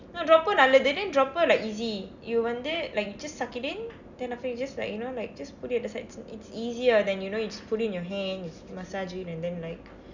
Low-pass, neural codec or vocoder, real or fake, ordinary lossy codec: 7.2 kHz; none; real; none